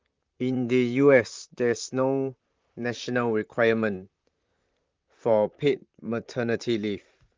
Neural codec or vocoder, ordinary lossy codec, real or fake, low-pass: vocoder, 44.1 kHz, 128 mel bands, Pupu-Vocoder; Opus, 24 kbps; fake; 7.2 kHz